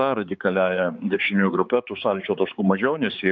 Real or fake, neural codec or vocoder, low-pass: fake; codec, 16 kHz, 4 kbps, X-Codec, HuBERT features, trained on balanced general audio; 7.2 kHz